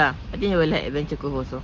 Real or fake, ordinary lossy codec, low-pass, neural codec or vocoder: real; Opus, 16 kbps; 7.2 kHz; none